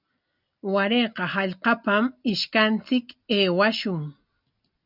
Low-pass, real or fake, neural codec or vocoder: 5.4 kHz; real; none